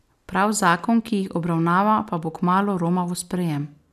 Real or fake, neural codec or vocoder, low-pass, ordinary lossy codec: real; none; 14.4 kHz; none